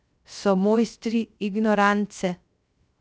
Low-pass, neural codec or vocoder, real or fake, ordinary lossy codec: none; codec, 16 kHz, 0.3 kbps, FocalCodec; fake; none